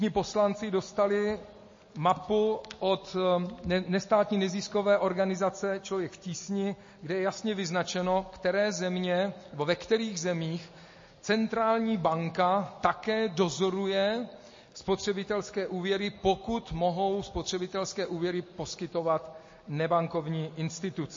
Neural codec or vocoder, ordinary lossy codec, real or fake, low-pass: none; MP3, 32 kbps; real; 7.2 kHz